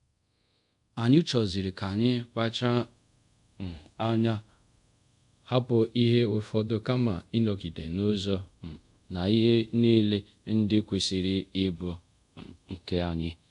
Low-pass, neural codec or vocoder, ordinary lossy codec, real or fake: 10.8 kHz; codec, 24 kHz, 0.5 kbps, DualCodec; none; fake